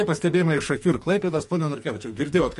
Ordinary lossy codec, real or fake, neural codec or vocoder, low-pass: MP3, 48 kbps; fake; codec, 44.1 kHz, 2.6 kbps, SNAC; 14.4 kHz